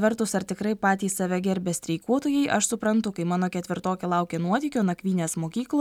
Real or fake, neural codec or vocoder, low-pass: real; none; 19.8 kHz